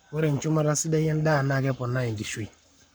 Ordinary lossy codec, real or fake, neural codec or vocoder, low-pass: none; fake; codec, 44.1 kHz, 7.8 kbps, Pupu-Codec; none